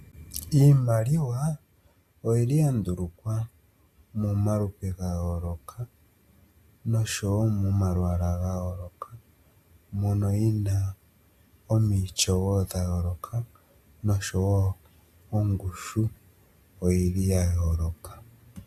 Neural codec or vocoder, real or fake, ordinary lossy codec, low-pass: none; real; AAC, 96 kbps; 14.4 kHz